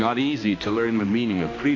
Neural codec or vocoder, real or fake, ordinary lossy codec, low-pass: codec, 16 kHz, 2 kbps, X-Codec, HuBERT features, trained on balanced general audio; fake; AAC, 32 kbps; 7.2 kHz